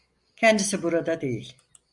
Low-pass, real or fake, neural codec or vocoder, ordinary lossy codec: 10.8 kHz; real; none; Opus, 64 kbps